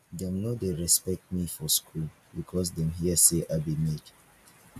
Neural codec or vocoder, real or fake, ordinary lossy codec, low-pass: none; real; none; 14.4 kHz